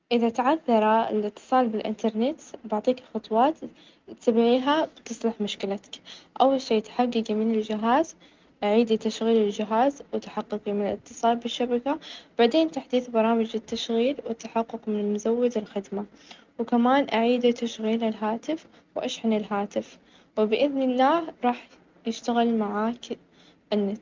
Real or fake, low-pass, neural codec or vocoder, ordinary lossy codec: real; 7.2 kHz; none; Opus, 24 kbps